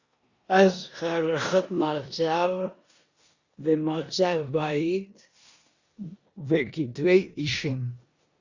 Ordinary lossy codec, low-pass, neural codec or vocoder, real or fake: Opus, 64 kbps; 7.2 kHz; codec, 16 kHz in and 24 kHz out, 0.9 kbps, LongCat-Audio-Codec, four codebook decoder; fake